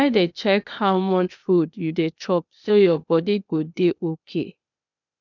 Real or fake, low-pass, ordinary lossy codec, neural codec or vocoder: fake; 7.2 kHz; none; codec, 16 kHz, 0.8 kbps, ZipCodec